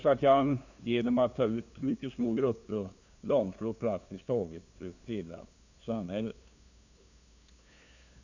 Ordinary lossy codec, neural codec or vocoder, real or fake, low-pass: none; codec, 16 kHz, 2 kbps, FunCodec, trained on LibriTTS, 25 frames a second; fake; 7.2 kHz